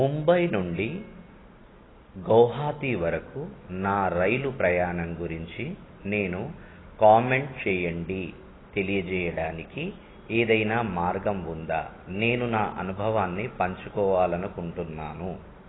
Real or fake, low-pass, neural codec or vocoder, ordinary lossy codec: real; 7.2 kHz; none; AAC, 16 kbps